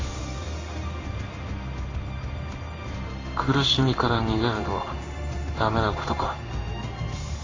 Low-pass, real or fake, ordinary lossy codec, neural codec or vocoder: 7.2 kHz; fake; AAC, 32 kbps; codec, 16 kHz in and 24 kHz out, 1 kbps, XY-Tokenizer